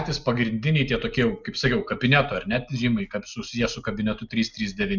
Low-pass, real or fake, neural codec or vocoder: 7.2 kHz; real; none